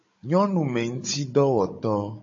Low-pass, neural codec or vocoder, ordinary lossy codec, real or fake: 7.2 kHz; codec, 16 kHz, 16 kbps, FunCodec, trained on Chinese and English, 50 frames a second; MP3, 32 kbps; fake